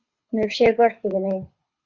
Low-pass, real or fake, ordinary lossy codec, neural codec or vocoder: 7.2 kHz; fake; Opus, 64 kbps; codec, 24 kHz, 6 kbps, HILCodec